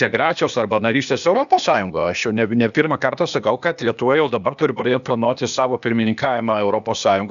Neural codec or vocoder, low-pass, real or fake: codec, 16 kHz, 0.8 kbps, ZipCodec; 7.2 kHz; fake